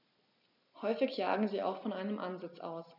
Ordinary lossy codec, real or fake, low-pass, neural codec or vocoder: none; real; 5.4 kHz; none